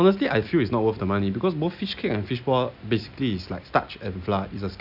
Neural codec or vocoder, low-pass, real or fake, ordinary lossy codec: none; 5.4 kHz; real; none